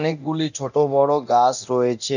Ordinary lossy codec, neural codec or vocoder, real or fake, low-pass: none; codec, 24 kHz, 0.9 kbps, DualCodec; fake; 7.2 kHz